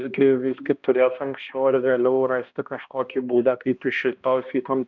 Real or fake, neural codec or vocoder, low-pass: fake; codec, 16 kHz, 1 kbps, X-Codec, HuBERT features, trained on balanced general audio; 7.2 kHz